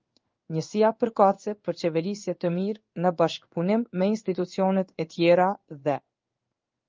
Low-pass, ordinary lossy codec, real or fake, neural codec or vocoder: 7.2 kHz; Opus, 32 kbps; fake; codec, 16 kHz in and 24 kHz out, 1 kbps, XY-Tokenizer